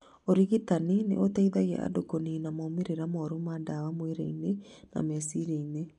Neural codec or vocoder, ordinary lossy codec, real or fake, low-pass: none; none; real; 10.8 kHz